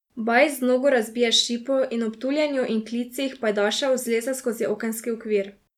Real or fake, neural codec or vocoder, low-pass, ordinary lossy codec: real; none; 19.8 kHz; none